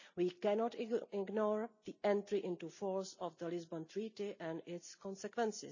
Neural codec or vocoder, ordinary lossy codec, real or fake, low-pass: none; none; real; 7.2 kHz